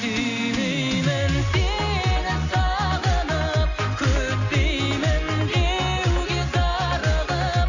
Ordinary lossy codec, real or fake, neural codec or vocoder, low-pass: none; real; none; 7.2 kHz